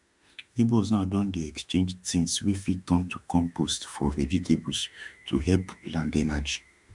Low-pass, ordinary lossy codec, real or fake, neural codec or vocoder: 10.8 kHz; none; fake; autoencoder, 48 kHz, 32 numbers a frame, DAC-VAE, trained on Japanese speech